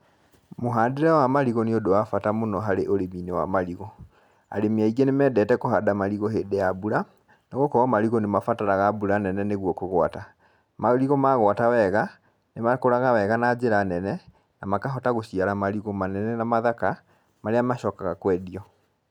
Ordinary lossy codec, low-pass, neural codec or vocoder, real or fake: none; 19.8 kHz; none; real